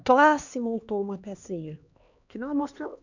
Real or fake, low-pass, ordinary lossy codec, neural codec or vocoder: fake; 7.2 kHz; none; codec, 16 kHz, 2 kbps, X-Codec, HuBERT features, trained on LibriSpeech